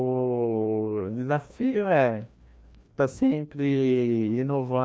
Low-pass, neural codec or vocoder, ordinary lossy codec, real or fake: none; codec, 16 kHz, 1 kbps, FreqCodec, larger model; none; fake